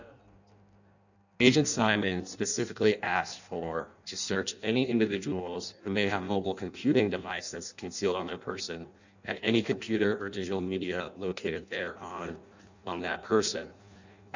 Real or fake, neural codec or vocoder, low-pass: fake; codec, 16 kHz in and 24 kHz out, 0.6 kbps, FireRedTTS-2 codec; 7.2 kHz